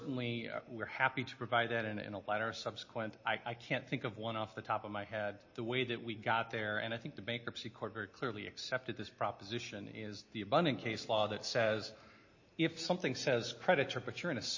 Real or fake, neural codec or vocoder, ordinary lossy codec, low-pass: real; none; MP3, 32 kbps; 7.2 kHz